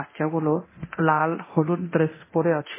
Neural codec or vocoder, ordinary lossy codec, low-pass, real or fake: codec, 24 kHz, 0.9 kbps, DualCodec; MP3, 16 kbps; 3.6 kHz; fake